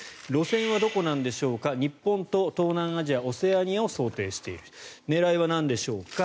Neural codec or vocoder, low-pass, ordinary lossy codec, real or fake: none; none; none; real